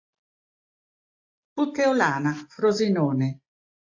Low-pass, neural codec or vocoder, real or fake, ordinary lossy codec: 7.2 kHz; none; real; AAC, 48 kbps